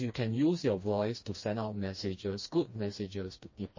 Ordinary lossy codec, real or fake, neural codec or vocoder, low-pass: MP3, 32 kbps; fake; codec, 16 kHz, 2 kbps, FreqCodec, smaller model; 7.2 kHz